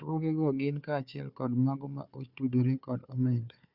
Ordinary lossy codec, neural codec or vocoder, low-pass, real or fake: Opus, 64 kbps; codec, 16 kHz, 4 kbps, FunCodec, trained on Chinese and English, 50 frames a second; 5.4 kHz; fake